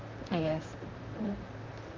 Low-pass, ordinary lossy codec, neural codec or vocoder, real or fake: 7.2 kHz; Opus, 24 kbps; vocoder, 44.1 kHz, 128 mel bands, Pupu-Vocoder; fake